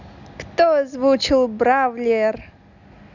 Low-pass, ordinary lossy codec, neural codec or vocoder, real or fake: 7.2 kHz; none; none; real